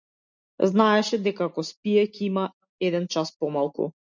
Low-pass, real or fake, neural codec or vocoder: 7.2 kHz; real; none